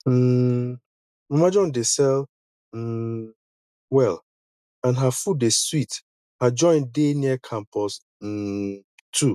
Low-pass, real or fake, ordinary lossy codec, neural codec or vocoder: 14.4 kHz; real; none; none